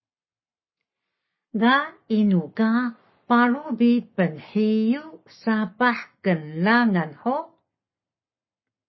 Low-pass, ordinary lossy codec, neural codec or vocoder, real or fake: 7.2 kHz; MP3, 24 kbps; codec, 44.1 kHz, 7.8 kbps, Pupu-Codec; fake